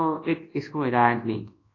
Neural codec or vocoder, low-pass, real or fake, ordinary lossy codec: codec, 24 kHz, 0.9 kbps, WavTokenizer, large speech release; 7.2 kHz; fake; AAC, 32 kbps